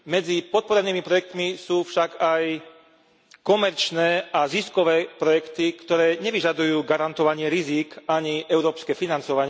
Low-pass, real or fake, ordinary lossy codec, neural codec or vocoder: none; real; none; none